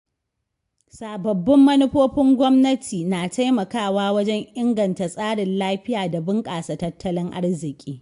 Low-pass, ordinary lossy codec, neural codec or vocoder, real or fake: 10.8 kHz; AAC, 64 kbps; none; real